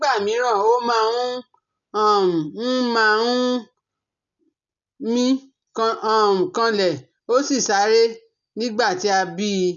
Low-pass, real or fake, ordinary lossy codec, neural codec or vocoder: 7.2 kHz; real; none; none